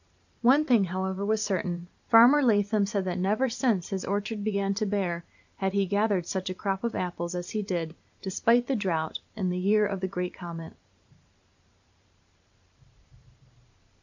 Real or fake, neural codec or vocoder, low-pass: fake; vocoder, 44.1 kHz, 128 mel bands every 512 samples, BigVGAN v2; 7.2 kHz